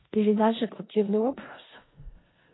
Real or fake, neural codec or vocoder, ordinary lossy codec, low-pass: fake; codec, 16 kHz in and 24 kHz out, 0.4 kbps, LongCat-Audio-Codec, four codebook decoder; AAC, 16 kbps; 7.2 kHz